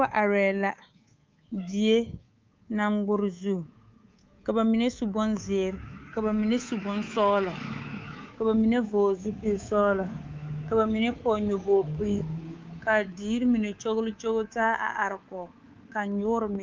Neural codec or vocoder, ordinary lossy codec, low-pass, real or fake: codec, 24 kHz, 3.1 kbps, DualCodec; Opus, 32 kbps; 7.2 kHz; fake